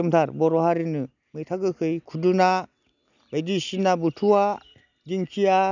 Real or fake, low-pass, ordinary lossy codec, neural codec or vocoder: real; 7.2 kHz; none; none